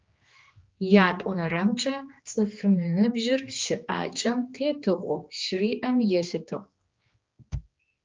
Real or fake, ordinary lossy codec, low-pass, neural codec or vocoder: fake; Opus, 24 kbps; 7.2 kHz; codec, 16 kHz, 2 kbps, X-Codec, HuBERT features, trained on general audio